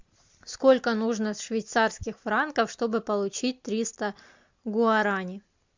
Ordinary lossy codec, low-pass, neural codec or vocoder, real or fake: MP3, 64 kbps; 7.2 kHz; none; real